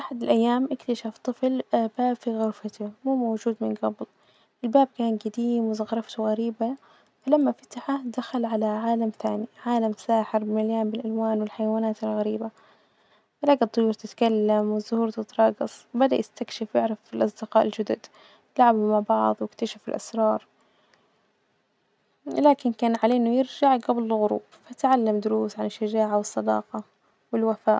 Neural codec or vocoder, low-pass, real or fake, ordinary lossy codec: none; none; real; none